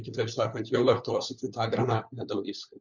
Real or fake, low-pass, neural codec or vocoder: fake; 7.2 kHz; codec, 16 kHz, 4 kbps, FunCodec, trained on LibriTTS, 50 frames a second